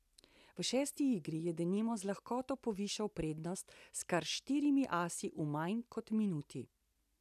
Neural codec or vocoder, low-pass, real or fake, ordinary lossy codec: none; 14.4 kHz; real; none